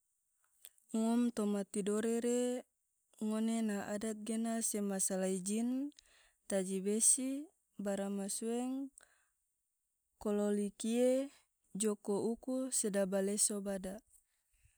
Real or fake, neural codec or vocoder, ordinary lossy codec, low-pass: real; none; none; none